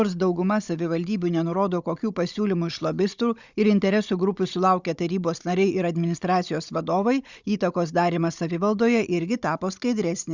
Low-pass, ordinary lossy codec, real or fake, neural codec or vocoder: 7.2 kHz; Opus, 64 kbps; fake; codec, 16 kHz, 16 kbps, FunCodec, trained on Chinese and English, 50 frames a second